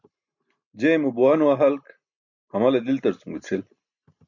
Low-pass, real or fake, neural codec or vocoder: 7.2 kHz; real; none